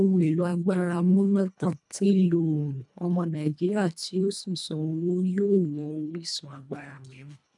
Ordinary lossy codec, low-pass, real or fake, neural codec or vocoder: none; 10.8 kHz; fake; codec, 24 kHz, 1.5 kbps, HILCodec